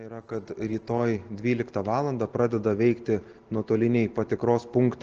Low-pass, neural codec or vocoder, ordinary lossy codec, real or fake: 7.2 kHz; none; Opus, 16 kbps; real